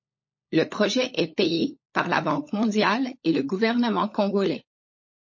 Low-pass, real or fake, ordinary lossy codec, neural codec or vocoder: 7.2 kHz; fake; MP3, 32 kbps; codec, 16 kHz, 16 kbps, FunCodec, trained on LibriTTS, 50 frames a second